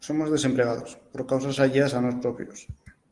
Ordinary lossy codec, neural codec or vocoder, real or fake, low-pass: Opus, 24 kbps; none; real; 10.8 kHz